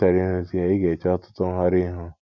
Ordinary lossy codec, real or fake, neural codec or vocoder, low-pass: none; real; none; 7.2 kHz